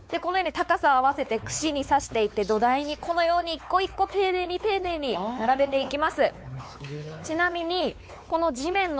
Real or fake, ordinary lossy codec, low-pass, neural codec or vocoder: fake; none; none; codec, 16 kHz, 4 kbps, X-Codec, WavLM features, trained on Multilingual LibriSpeech